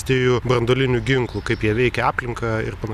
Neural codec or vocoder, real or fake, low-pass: none; real; 14.4 kHz